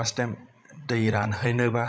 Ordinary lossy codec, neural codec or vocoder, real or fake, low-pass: none; codec, 16 kHz, 16 kbps, FreqCodec, larger model; fake; none